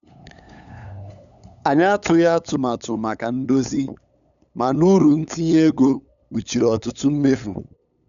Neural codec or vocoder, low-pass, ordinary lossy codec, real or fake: codec, 16 kHz, 8 kbps, FunCodec, trained on LibriTTS, 25 frames a second; 7.2 kHz; none; fake